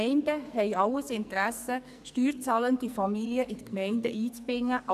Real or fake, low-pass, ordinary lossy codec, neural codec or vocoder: fake; 14.4 kHz; none; codec, 44.1 kHz, 2.6 kbps, SNAC